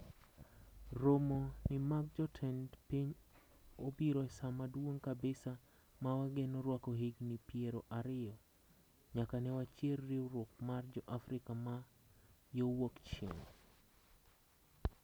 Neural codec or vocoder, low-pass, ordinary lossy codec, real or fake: none; none; none; real